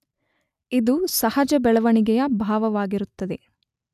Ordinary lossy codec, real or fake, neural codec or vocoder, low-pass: none; real; none; 14.4 kHz